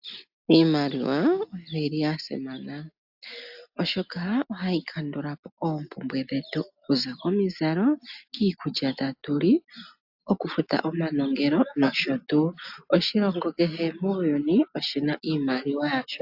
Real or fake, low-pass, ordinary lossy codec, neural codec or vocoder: real; 5.4 kHz; AAC, 48 kbps; none